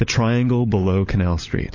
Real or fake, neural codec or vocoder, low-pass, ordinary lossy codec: fake; codec, 16 kHz, 16 kbps, FunCodec, trained on LibriTTS, 50 frames a second; 7.2 kHz; MP3, 32 kbps